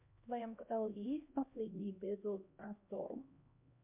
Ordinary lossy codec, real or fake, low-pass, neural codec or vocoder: MP3, 32 kbps; fake; 3.6 kHz; codec, 16 kHz, 1 kbps, X-Codec, HuBERT features, trained on LibriSpeech